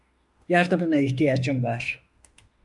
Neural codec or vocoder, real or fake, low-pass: autoencoder, 48 kHz, 32 numbers a frame, DAC-VAE, trained on Japanese speech; fake; 10.8 kHz